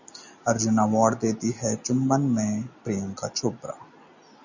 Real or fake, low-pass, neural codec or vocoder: real; 7.2 kHz; none